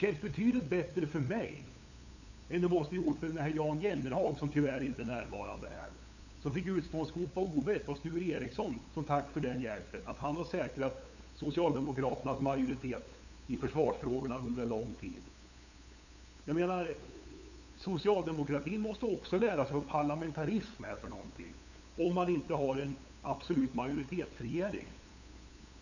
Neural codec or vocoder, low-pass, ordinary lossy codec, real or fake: codec, 16 kHz, 8 kbps, FunCodec, trained on LibriTTS, 25 frames a second; 7.2 kHz; none; fake